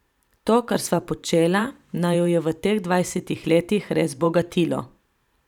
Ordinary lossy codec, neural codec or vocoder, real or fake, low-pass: none; vocoder, 44.1 kHz, 128 mel bands every 512 samples, BigVGAN v2; fake; 19.8 kHz